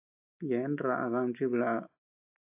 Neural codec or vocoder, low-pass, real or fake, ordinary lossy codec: none; 3.6 kHz; real; AAC, 32 kbps